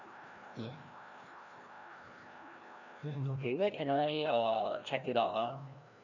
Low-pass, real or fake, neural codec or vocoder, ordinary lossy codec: 7.2 kHz; fake; codec, 16 kHz, 1 kbps, FreqCodec, larger model; none